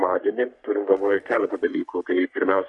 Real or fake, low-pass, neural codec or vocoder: fake; 10.8 kHz; codec, 44.1 kHz, 3.4 kbps, Pupu-Codec